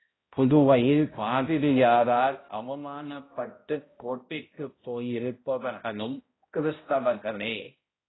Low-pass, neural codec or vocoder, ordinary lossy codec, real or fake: 7.2 kHz; codec, 16 kHz, 0.5 kbps, X-Codec, HuBERT features, trained on balanced general audio; AAC, 16 kbps; fake